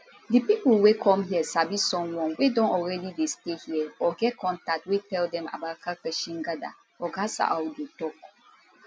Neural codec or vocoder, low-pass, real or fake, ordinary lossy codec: none; none; real; none